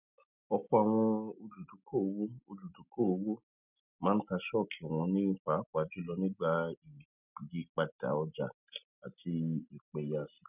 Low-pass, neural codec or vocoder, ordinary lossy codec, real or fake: 3.6 kHz; none; none; real